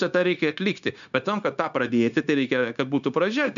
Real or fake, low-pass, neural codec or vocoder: fake; 7.2 kHz; codec, 16 kHz, 0.9 kbps, LongCat-Audio-Codec